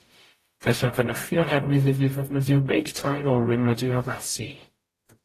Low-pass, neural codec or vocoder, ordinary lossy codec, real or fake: 14.4 kHz; codec, 44.1 kHz, 0.9 kbps, DAC; AAC, 48 kbps; fake